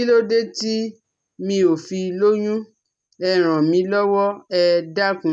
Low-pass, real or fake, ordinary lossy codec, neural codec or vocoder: 9.9 kHz; real; none; none